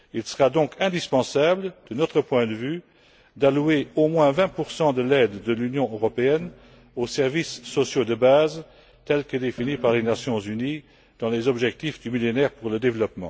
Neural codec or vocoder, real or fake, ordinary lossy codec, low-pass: none; real; none; none